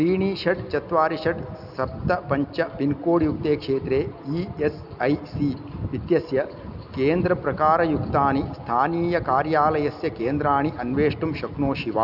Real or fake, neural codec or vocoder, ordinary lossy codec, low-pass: real; none; none; 5.4 kHz